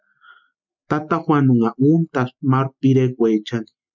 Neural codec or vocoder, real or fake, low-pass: none; real; 7.2 kHz